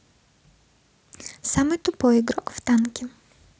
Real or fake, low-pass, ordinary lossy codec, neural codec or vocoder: real; none; none; none